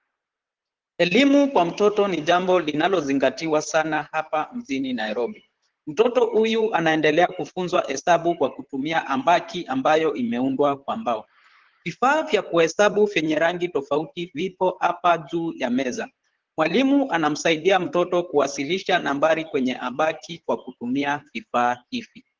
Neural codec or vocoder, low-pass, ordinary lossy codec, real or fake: vocoder, 44.1 kHz, 128 mel bands, Pupu-Vocoder; 7.2 kHz; Opus, 16 kbps; fake